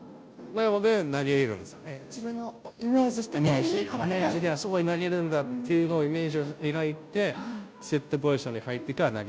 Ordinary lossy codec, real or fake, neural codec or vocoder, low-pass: none; fake; codec, 16 kHz, 0.5 kbps, FunCodec, trained on Chinese and English, 25 frames a second; none